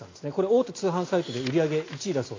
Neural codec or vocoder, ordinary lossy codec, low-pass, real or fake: none; AAC, 32 kbps; 7.2 kHz; real